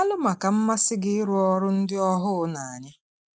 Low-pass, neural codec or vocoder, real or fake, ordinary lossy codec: none; none; real; none